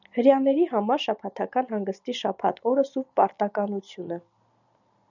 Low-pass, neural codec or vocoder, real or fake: 7.2 kHz; none; real